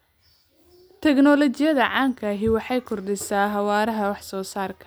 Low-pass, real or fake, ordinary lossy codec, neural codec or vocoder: none; real; none; none